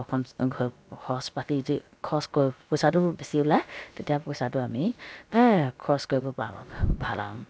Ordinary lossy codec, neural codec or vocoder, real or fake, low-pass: none; codec, 16 kHz, about 1 kbps, DyCAST, with the encoder's durations; fake; none